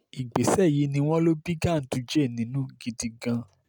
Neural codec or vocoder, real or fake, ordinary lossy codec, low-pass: none; real; none; none